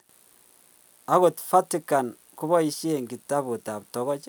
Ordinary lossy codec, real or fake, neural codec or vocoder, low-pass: none; real; none; none